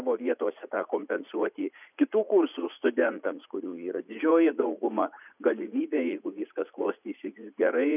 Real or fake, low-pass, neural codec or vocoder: fake; 3.6 kHz; vocoder, 44.1 kHz, 80 mel bands, Vocos